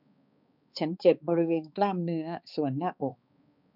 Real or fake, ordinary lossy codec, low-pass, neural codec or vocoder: fake; none; 5.4 kHz; codec, 16 kHz, 2 kbps, X-Codec, HuBERT features, trained on balanced general audio